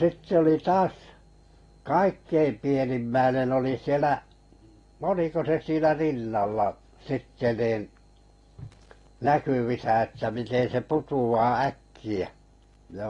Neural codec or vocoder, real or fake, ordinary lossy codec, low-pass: none; real; AAC, 32 kbps; 10.8 kHz